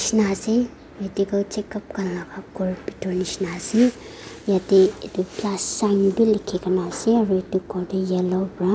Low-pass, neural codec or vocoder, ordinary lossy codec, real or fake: none; none; none; real